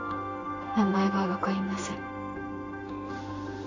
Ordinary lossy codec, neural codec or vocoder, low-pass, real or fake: AAC, 32 kbps; codec, 16 kHz in and 24 kHz out, 1 kbps, XY-Tokenizer; 7.2 kHz; fake